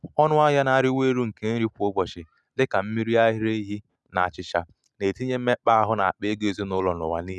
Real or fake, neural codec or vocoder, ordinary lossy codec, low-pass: real; none; none; none